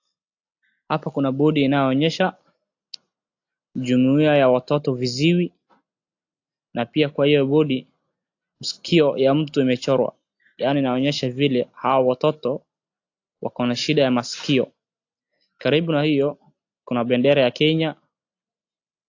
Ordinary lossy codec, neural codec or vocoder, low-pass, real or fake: AAC, 48 kbps; none; 7.2 kHz; real